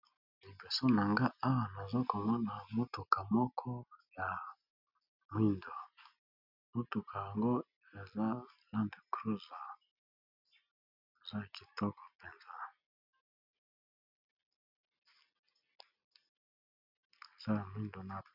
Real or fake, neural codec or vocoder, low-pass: real; none; 5.4 kHz